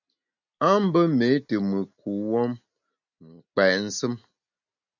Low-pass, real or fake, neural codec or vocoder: 7.2 kHz; real; none